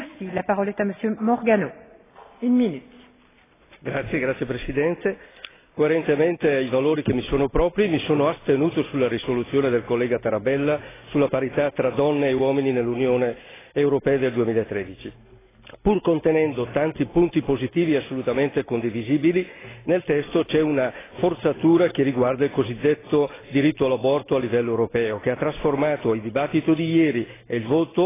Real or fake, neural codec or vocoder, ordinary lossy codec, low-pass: real; none; AAC, 16 kbps; 3.6 kHz